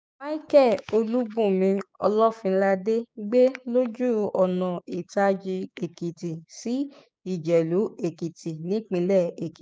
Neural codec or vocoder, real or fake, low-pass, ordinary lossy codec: codec, 16 kHz, 6 kbps, DAC; fake; none; none